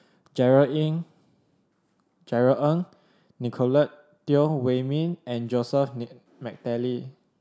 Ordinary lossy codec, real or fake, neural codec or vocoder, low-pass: none; real; none; none